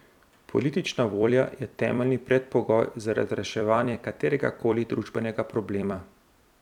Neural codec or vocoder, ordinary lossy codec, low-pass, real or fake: vocoder, 44.1 kHz, 128 mel bands every 256 samples, BigVGAN v2; none; 19.8 kHz; fake